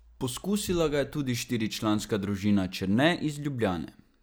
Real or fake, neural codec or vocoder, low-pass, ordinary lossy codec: real; none; none; none